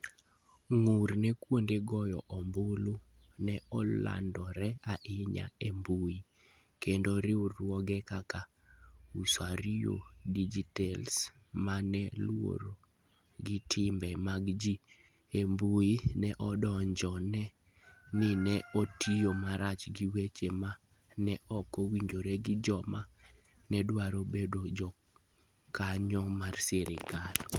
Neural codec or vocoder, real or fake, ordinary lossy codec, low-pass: none; real; Opus, 32 kbps; 19.8 kHz